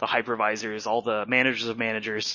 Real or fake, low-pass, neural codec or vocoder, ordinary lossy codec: real; 7.2 kHz; none; MP3, 32 kbps